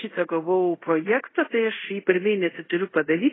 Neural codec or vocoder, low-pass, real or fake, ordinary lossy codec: codec, 24 kHz, 0.5 kbps, DualCodec; 7.2 kHz; fake; AAC, 16 kbps